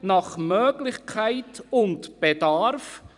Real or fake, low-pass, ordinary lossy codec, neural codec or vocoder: fake; 10.8 kHz; none; vocoder, 44.1 kHz, 128 mel bands every 256 samples, BigVGAN v2